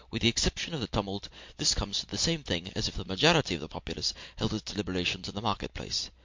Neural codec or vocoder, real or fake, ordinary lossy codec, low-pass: none; real; MP3, 48 kbps; 7.2 kHz